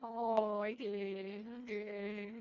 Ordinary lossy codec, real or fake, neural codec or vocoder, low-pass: none; fake; codec, 24 kHz, 1.5 kbps, HILCodec; 7.2 kHz